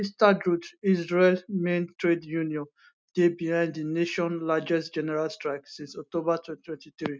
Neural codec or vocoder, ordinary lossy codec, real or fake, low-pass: none; none; real; none